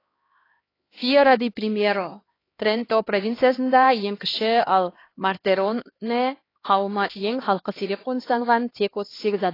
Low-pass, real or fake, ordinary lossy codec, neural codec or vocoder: 5.4 kHz; fake; AAC, 24 kbps; codec, 16 kHz, 2 kbps, X-Codec, HuBERT features, trained on LibriSpeech